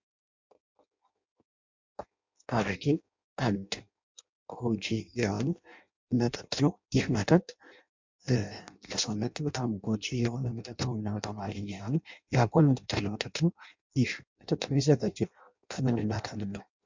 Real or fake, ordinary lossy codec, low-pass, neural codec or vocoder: fake; MP3, 64 kbps; 7.2 kHz; codec, 16 kHz in and 24 kHz out, 0.6 kbps, FireRedTTS-2 codec